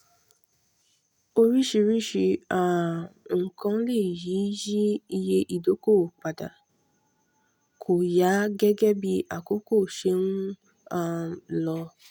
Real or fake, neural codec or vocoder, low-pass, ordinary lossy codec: real; none; 19.8 kHz; none